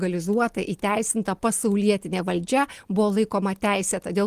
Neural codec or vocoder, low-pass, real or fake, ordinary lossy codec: none; 14.4 kHz; real; Opus, 24 kbps